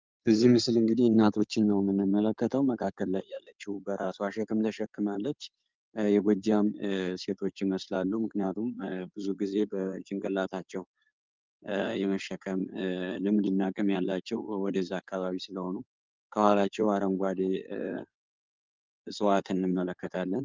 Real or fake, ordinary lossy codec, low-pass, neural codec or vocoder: fake; Opus, 24 kbps; 7.2 kHz; codec, 16 kHz in and 24 kHz out, 2.2 kbps, FireRedTTS-2 codec